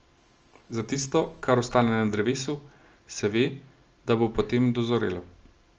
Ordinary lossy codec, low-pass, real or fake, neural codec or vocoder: Opus, 24 kbps; 7.2 kHz; real; none